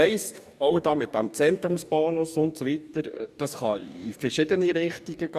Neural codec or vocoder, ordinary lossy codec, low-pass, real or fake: codec, 44.1 kHz, 2.6 kbps, DAC; none; 14.4 kHz; fake